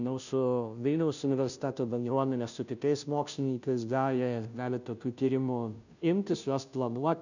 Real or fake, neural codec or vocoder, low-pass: fake; codec, 16 kHz, 0.5 kbps, FunCodec, trained on Chinese and English, 25 frames a second; 7.2 kHz